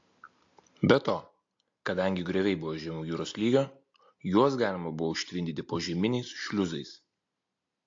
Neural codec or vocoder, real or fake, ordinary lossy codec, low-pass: none; real; AAC, 48 kbps; 7.2 kHz